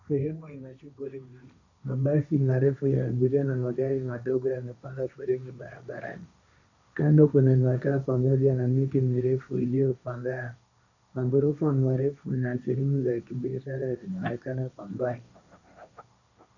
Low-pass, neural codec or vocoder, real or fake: 7.2 kHz; codec, 16 kHz, 1.1 kbps, Voila-Tokenizer; fake